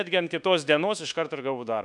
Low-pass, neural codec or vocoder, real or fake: 10.8 kHz; codec, 24 kHz, 1.2 kbps, DualCodec; fake